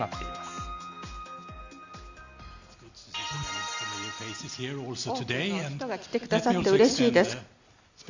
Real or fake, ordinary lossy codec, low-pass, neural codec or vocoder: real; Opus, 64 kbps; 7.2 kHz; none